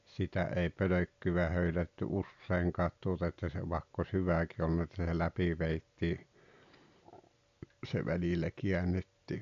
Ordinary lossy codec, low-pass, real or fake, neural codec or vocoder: AAC, 64 kbps; 7.2 kHz; real; none